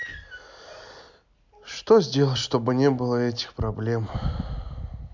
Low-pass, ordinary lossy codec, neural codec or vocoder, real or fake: 7.2 kHz; MP3, 64 kbps; none; real